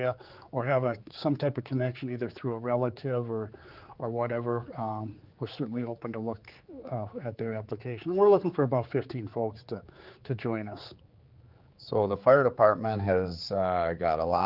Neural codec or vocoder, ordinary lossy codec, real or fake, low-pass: codec, 16 kHz, 4 kbps, X-Codec, HuBERT features, trained on general audio; Opus, 32 kbps; fake; 5.4 kHz